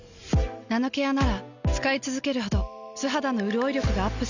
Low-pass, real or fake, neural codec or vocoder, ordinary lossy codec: 7.2 kHz; real; none; none